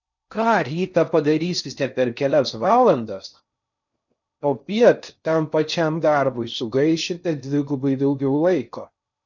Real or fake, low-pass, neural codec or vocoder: fake; 7.2 kHz; codec, 16 kHz in and 24 kHz out, 0.6 kbps, FocalCodec, streaming, 4096 codes